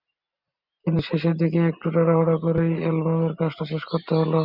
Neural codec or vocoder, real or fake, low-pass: none; real; 5.4 kHz